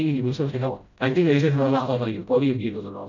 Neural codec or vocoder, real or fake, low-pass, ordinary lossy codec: codec, 16 kHz, 0.5 kbps, FreqCodec, smaller model; fake; 7.2 kHz; none